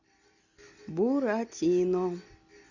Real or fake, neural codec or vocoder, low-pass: real; none; 7.2 kHz